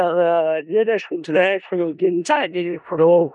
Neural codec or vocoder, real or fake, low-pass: codec, 16 kHz in and 24 kHz out, 0.4 kbps, LongCat-Audio-Codec, four codebook decoder; fake; 10.8 kHz